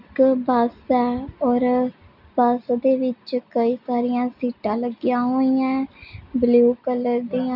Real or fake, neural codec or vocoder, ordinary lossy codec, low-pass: real; none; none; 5.4 kHz